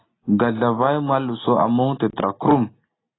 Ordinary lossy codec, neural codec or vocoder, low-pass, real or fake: AAC, 16 kbps; none; 7.2 kHz; real